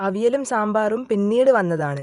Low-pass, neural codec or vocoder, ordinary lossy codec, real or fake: 10.8 kHz; vocoder, 24 kHz, 100 mel bands, Vocos; none; fake